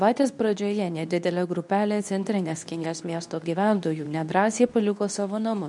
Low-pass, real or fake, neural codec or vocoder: 10.8 kHz; fake; codec, 24 kHz, 0.9 kbps, WavTokenizer, medium speech release version 2